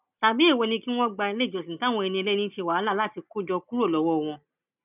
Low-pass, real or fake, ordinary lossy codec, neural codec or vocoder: 3.6 kHz; real; none; none